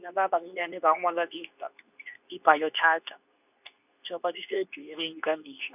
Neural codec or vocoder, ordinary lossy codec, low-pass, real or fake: codec, 24 kHz, 0.9 kbps, WavTokenizer, medium speech release version 2; none; 3.6 kHz; fake